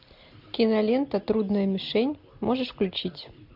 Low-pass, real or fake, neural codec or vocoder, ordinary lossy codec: 5.4 kHz; real; none; MP3, 48 kbps